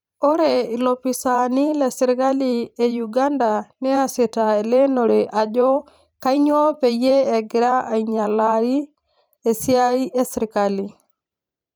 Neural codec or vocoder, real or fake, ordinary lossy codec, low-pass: vocoder, 44.1 kHz, 128 mel bands every 512 samples, BigVGAN v2; fake; none; none